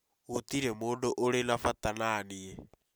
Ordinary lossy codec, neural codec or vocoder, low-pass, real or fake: none; none; none; real